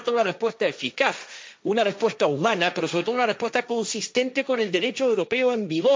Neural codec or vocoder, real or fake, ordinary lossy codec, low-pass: codec, 16 kHz, 1.1 kbps, Voila-Tokenizer; fake; none; none